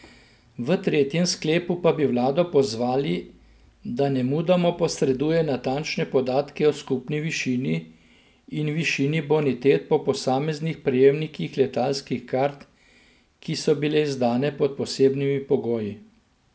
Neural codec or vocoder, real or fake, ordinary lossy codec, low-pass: none; real; none; none